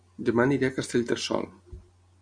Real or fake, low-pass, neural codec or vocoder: real; 9.9 kHz; none